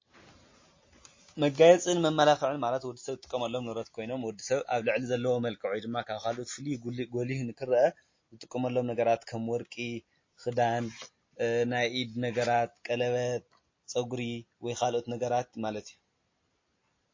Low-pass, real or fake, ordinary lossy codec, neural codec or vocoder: 7.2 kHz; real; MP3, 32 kbps; none